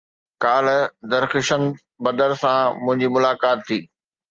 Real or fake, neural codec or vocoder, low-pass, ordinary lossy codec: real; none; 7.2 kHz; Opus, 24 kbps